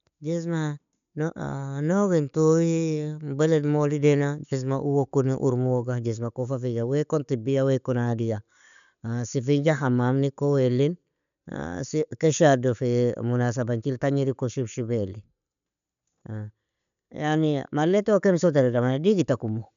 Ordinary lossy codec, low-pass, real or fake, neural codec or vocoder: none; 7.2 kHz; real; none